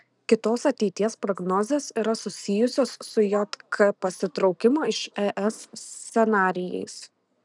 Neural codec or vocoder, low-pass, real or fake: none; 10.8 kHz; real